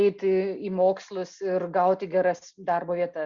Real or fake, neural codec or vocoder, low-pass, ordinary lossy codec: real; none; 7.2 kHz; Opus, 64 kbps